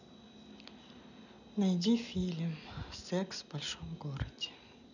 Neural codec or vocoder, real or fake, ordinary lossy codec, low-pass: none; real; none; 7.2 kHz